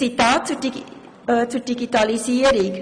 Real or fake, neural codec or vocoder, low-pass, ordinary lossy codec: real; none; 9.9 kHz; MP3, 96 kbps